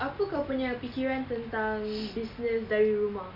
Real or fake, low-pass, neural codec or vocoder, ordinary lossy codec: real; 5.4 kHz; none; none